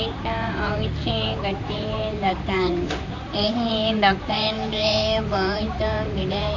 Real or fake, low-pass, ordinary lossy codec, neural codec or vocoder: fake; 7.2 kHz; MP3, 64 kbps; vocoder, 44.1 kHz, 128 mel bands, Pupu-Vocoder